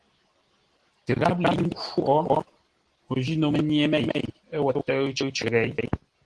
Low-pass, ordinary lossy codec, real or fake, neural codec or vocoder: 10.8 kHz; Opus, 16 kbps; real; none